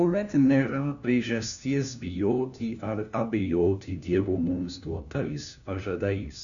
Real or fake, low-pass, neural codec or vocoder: fake; 7.2 kHz; codec, 16 kHz, 1 kbps, FunCodec, trained on LibriTTS, 50 frames a second